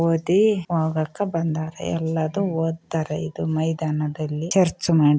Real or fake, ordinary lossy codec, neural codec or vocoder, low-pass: real; none; none; none